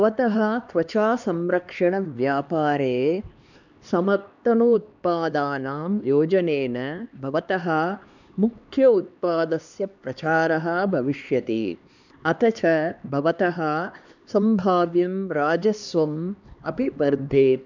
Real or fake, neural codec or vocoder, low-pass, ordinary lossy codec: fake; codec, 16 kHz, 2 kbps, X-Codec, HuBERT features, trained on LibriSpeech; 7.2 kHz; none